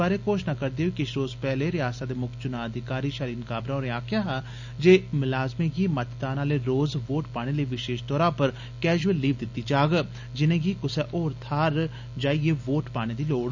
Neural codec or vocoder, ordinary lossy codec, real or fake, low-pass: none; none; real; 7.2 kHz